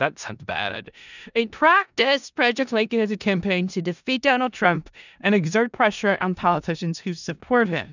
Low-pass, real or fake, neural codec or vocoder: 7.2 kHz; fake; codec, 16 kHz in and 24 kHz out, 0.4 kbps, LongCat-Audio-Codec, four codebook decoder